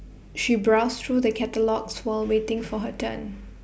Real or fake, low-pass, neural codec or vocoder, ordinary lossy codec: real; none; none; none